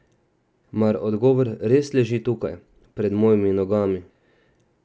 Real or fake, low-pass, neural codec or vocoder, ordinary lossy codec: real; none; none; none